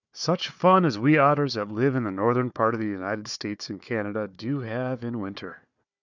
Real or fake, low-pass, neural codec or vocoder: fake; 7.2 kHz; codec, 16 kHz, 4 kbps, FunCodec, trained on Chinese and English, 50 frames a second